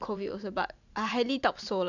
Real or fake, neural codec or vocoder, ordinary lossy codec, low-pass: fake; vocoder, 44.1 kHz, 128 mel bands every 512 samples, BigVGAN v2; none; 7.2 kHz